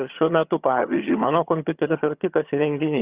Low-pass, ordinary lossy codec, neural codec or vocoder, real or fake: 3.6 kHz; Opus, 64 kbps; vocoder, 22.05 kHz, 80 mel bands, HiFi-GAN; fake